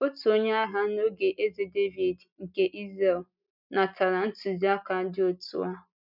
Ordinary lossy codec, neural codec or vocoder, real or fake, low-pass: none; none; real; 5.4 kHz